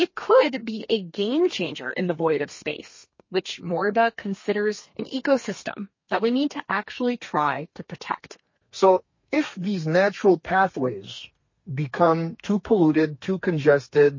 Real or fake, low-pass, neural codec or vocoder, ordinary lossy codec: fake; 7.2 kHz; codec, 32 kHz, 1.9 kbps, SNAC; MP3, 32 kbps